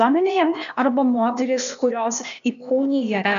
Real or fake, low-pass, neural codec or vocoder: fake; 7.2 kHz; codec, 16 kHz, 0.8 kbps, ZipCodec